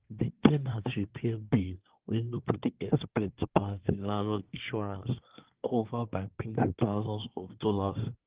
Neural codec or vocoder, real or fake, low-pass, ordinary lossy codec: codec, 24 kHz, 1 kbps, SNAC; fake; 3.6 kHz; Opus, 16 kbps